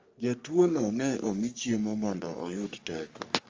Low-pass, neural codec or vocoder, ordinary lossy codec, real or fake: 7.2 kHz; codec, 44.1 kHz, 3.4 kbps, Pupu-Codec; Opus, 32 kbps; fake